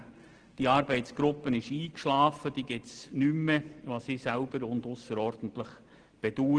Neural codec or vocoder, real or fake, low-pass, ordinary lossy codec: none; real; 9.9 kHz; Opus, 16 kbps